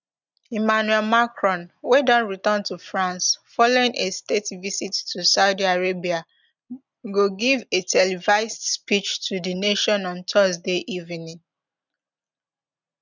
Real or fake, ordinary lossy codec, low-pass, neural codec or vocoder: real; none; 7.2 kHz; none